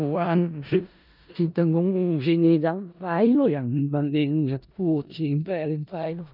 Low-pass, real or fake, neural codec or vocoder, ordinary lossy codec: 5.4 kHz; fake; codec, 16 kHz in and 24 kHz out, 0.4 kbps, LongCat-Audio-Codec, four codebook decoder; none